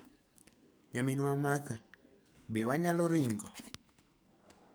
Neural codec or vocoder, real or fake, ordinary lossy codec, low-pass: codec, 44.1 kHz, 2.6 kbps, SNAC; fake; none; none